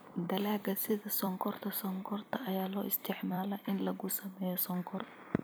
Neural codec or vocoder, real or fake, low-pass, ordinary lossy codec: vocoder, 44.1 kHz, 128 mel bands every 256 samples, BigVGAN v2; fake; none; none